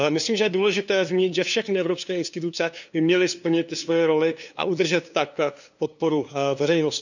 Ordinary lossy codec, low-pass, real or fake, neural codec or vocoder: none; 7.2 kHz; fake; codec, 16 kHz, 2 kbps, FunCodec, trained on LibriTTS, 25 frames a second